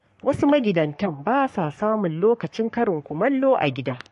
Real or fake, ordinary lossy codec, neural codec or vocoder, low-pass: fake; MP3, 48 kbps; codec, 44.1 kHz, 3.4 kbps, Pupu-Codec; 14.4 kHz